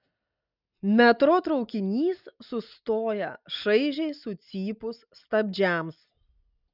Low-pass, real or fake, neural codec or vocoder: 5.4 kHz; real; none